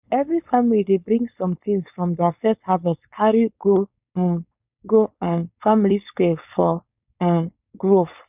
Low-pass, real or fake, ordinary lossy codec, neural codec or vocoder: 3.6 kHz; fake; none; codec, 16 kHz, 4.8 kbps, FACodec